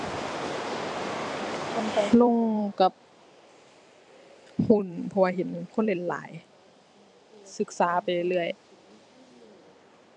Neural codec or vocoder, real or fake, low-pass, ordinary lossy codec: vocoder, 44.1 kHz, 128 mel bands every 256 samples, BigVGAN v2; fake; 10.8 kHz; none